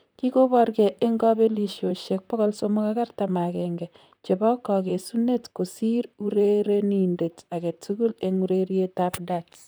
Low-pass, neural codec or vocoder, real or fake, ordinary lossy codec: none; vocoder, 44.1 kHz, 128 mel bands, Pupu-Vocoder; fake; none